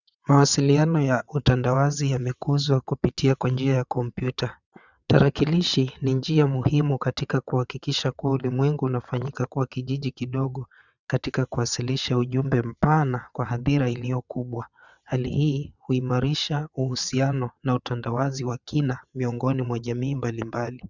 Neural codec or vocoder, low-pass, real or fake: vocoder, 22.05 kHz, 80 mel bands, WaveNeXt; 7.2 kHz; fake